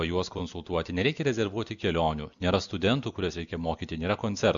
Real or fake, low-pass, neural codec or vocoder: real; 7.2 kHz; none